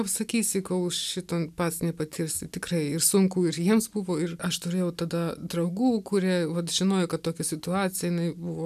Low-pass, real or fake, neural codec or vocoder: 14.4 kHz; real; none